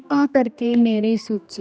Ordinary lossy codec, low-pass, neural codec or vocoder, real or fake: none; none; codec, 16 kHz, 1 kbps, X-Codec, HuBERT features, trained on general audio; fake